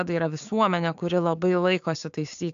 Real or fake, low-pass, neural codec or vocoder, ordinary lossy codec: fake; 7.2 kHz; codec, 16 kHz, 6 kbps, DAC; AAC, 64 kbps